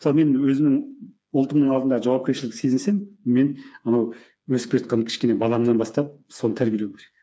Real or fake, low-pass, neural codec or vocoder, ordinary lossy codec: fake; none; codec, 16 kHz, 4 kbps, FreqCodec, smaller model; none